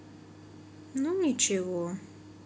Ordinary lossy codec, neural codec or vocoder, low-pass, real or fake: none; none; none; real